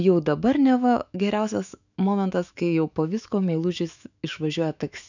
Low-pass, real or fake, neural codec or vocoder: 7.2 kHz; fake; autoencoder, 48 kHz, 128 numbers a frame, DAC-VAE, trained on Japanese speech